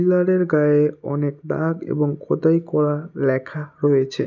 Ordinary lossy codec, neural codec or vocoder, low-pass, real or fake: none; none; 7.2 kHz; real